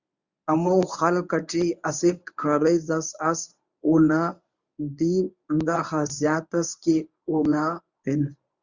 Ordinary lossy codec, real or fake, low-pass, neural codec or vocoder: Opus, 64 kbps; fake; 7.2 kHz; codec, 24 kHz, 0.9 kbps, WavTokenizer, medium speech release version 1